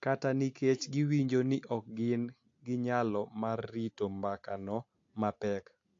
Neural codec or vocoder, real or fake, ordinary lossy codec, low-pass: none; real; AAC, 48 kbps; 7.2 kHz